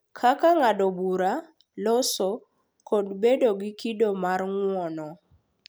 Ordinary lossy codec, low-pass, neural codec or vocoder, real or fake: none; none; none; real